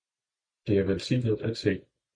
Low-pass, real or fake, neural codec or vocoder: 9.9 kHz; real; none